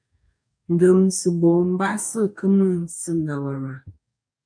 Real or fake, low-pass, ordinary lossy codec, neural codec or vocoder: fake; 9.9 kHz; MP3, 96 kbps; codec, 44.1 kHz, 2.6 kbps, DAC